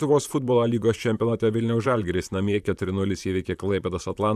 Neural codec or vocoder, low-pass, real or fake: vocoder, 44.1 kHz, 128 mel bands, Pupu-Vocoder; 14.4 kHz; fake